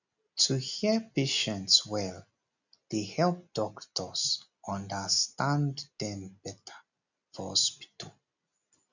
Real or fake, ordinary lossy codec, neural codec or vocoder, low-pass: real; none; none; 7.2 kHz